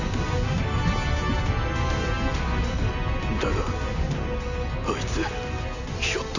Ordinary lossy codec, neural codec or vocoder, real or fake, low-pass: none; none; real; 7.2 kHz